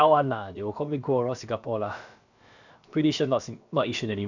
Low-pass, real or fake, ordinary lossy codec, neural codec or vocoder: 7.2 kHz; fake; none; codec, 16 kHz, about 1 kbps, DyCAST, with the encoder's durations